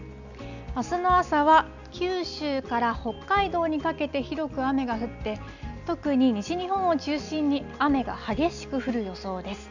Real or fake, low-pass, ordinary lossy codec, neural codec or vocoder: real; 7.2 kHz; none; none